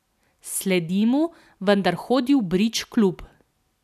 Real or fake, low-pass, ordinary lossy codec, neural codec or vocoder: real; 14.4 kHz; none; none